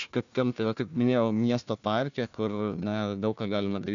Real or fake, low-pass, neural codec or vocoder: fake; 7.2 kHz; codec, 16 kHz, 1 kbps, FunCodec, trained on Chinese and English, 50 frames a second